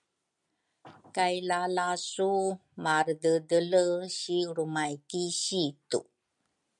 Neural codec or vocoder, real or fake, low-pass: none; real; 10.8 kHz